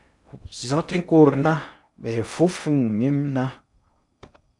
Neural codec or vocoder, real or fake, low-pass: codec, 16 kHz in and 24 kHz out, 0.6 kbps, FocalCodec, streaming, 2048 codes; fake; 10.8 kHz